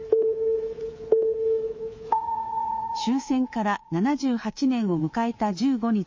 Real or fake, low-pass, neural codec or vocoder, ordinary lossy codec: fake; 7.2 kHz; codec, 24 kHz, 3.1 kbps, DualCodec; MP3, 32 kbps